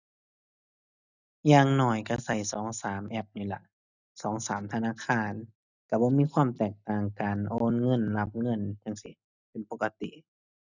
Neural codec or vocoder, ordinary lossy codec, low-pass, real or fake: none; none; 7.2 kHz; real